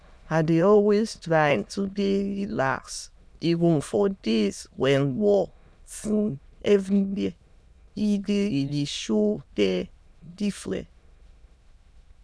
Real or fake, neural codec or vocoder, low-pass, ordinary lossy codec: fake; autoencoder, 22.05 kHz, a latent of 192 numbers a frame, VITS, trained on many speakers; none; none